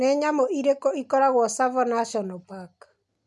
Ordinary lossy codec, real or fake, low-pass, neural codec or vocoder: none; real; none; none